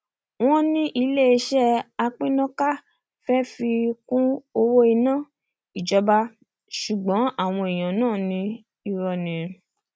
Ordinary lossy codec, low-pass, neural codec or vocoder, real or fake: none; none; none; real